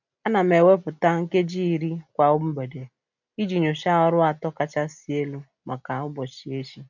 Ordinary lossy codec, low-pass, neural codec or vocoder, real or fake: none; 7.2 kHz; none; real